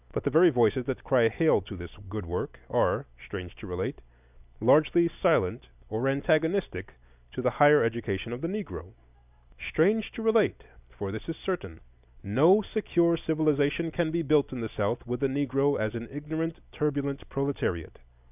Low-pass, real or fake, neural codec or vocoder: 3.6 kHz; real; none